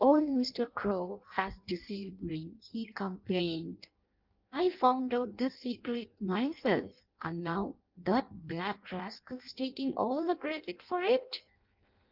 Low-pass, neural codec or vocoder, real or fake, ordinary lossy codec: 5.4 kHz; codec, 16 kHz in and 24 kHz out, 0.6 kbps, FireRedTTS-2 codec; fake; Opus, 24 kbps